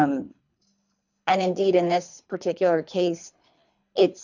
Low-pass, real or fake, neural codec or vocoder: 7.2 kHz; fake; codec, 24 kHz, 3 kbps, HILCodec